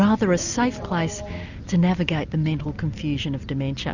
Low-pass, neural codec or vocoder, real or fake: 7.2 kHz; none; real